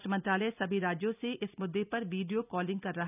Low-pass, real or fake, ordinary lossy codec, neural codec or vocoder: 3.6 kHz; real; none; none